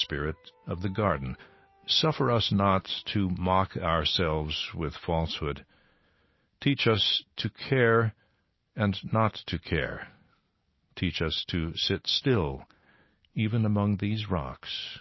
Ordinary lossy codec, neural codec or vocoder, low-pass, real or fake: MP3, 24 kbps; none; 7.2 kHz; real